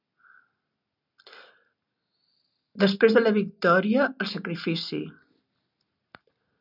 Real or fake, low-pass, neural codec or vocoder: real; 5.4 kHz; none